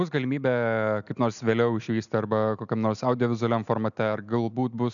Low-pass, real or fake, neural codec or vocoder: 7.2 kHz; real; none